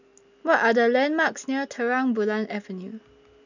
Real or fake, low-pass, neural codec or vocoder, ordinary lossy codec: real; 7.2 kHz; none; none